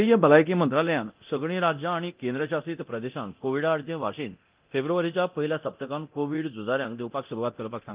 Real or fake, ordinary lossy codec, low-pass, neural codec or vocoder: fake; Opus, 32 kbps; 3.6 kHz; codec, 24 kHz, 0.9 kbps, DualCodec